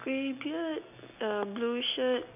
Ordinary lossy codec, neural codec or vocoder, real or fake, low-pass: none; none; real; 3.6 kHz